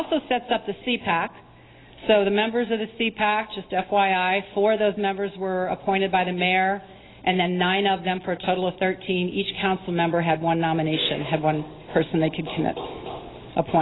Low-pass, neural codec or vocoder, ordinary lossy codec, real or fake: 7.2 kHz; none; AAC, 16 kbps; real